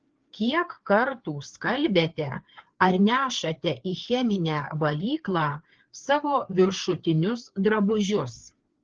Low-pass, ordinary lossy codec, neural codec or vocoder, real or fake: 7.2 kHz; Opus, 16 kbps; codec, 16 kHz, 4 kbps, FreqCodec, larger model; fake